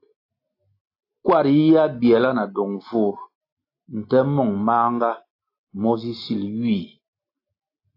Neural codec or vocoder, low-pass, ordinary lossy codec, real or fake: none; 5.4 kHz; AAC, 32 kbps; real